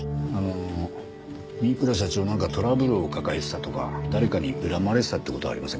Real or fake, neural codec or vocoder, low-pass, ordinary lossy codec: real; none; none; none